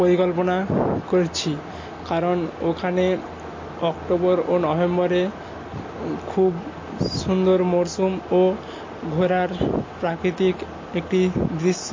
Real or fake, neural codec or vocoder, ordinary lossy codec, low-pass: real; none; MP3, 32 kbps; 7.2 kHz